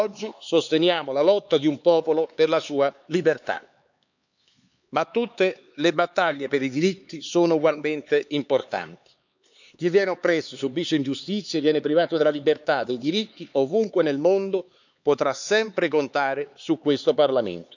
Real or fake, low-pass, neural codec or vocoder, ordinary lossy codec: fake; 7.2 kHz; codec, 16 kHz, 4 kbps, X-Codec, HuBERT features, trained on LibriSpeech; none